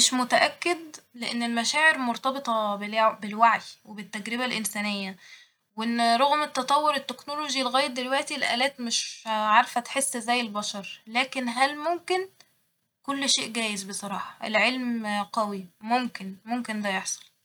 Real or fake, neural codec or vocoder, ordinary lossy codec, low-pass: real; none; none; none